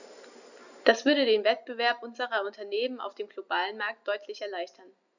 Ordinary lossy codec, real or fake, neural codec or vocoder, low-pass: none; real; none; 7.2 kHz